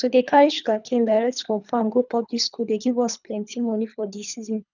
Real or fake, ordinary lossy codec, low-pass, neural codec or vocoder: fake; none; 7.2 kHz; codec, 24 kHz, 3 kbps, HILCodec